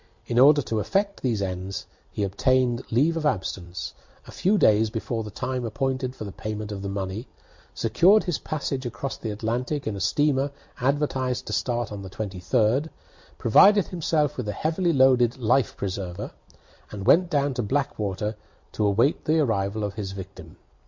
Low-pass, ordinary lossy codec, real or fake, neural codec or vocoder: 7.2 kHz; MP3, 48 kbps; real; none